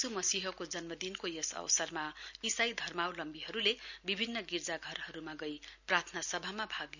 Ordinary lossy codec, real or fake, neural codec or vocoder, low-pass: none; real; none; 7.2 kHz